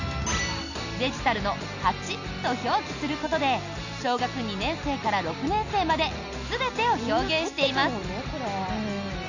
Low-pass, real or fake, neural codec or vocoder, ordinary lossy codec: 7.2 kHz; real; none; none